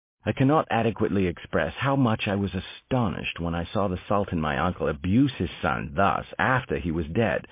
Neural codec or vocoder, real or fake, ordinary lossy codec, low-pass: none; real; MP3, 24 kbps; 3.6 kHz